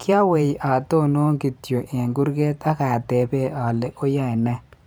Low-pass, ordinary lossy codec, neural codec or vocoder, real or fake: none; none; vocoder, 44.1 kHz, 128 mel bands every 512 samples, BigVGAN v2; fake